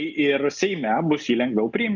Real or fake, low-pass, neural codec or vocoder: real; 7.2 kHz; none